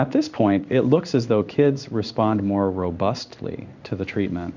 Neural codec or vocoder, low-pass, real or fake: codec, 16 kHz in and 24 kHz out, 1 kbps, XY-Tokenizer; 7.2 kHz; fake